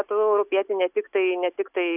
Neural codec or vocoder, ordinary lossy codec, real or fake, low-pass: none; AAC, 32 kbps; real; 3.6 kHz